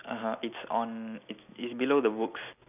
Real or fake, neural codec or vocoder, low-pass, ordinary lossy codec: real; none; 3.6 kHz; none